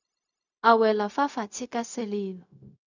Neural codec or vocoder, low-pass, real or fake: codec, 16 kHz, 0.4 kbps, LongCat-Audio-Codec; 7.2 kHz; fake